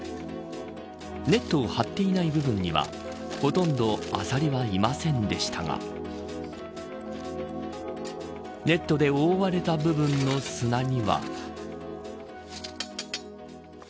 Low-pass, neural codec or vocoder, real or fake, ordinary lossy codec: none; none; real; none